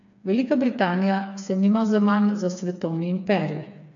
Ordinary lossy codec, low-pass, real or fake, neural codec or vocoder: none; 7.2 kHz; fake; codec, 16 kHz, 4 kbps, FreqCodec, smaller model